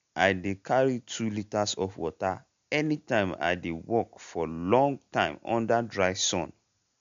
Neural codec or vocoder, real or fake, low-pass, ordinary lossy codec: none; real; 7.2 kHz; MP3, 64 kbps